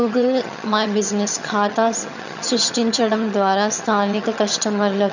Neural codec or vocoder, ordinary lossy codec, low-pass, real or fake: vocoder, 22.05 kHz, 80 mel bands, HiFi-GAN; none; 7.2 kHz; fake